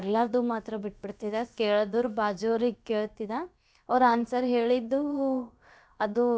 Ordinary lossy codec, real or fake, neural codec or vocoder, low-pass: none; fake; codec, 16 kHz, about 1 kbps, DyCAST, with the encoder's durations; none